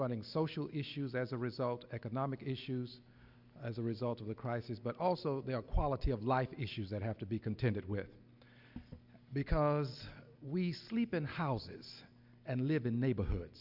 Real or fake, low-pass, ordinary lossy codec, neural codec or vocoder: real; 5.4 kHz; Opus, 64 kbps; none